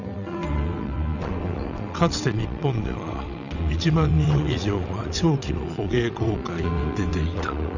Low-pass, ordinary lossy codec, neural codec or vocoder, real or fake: 7.2 kHz; none; vocoder, 22.05 kHz, 80 mel bands, WaveNeXt; fake